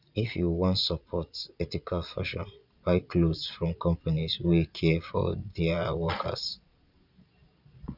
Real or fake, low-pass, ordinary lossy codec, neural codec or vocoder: fake; 5.4 kHz; none; vocoder, 22.05 kHz, 80 mel bands, Vocos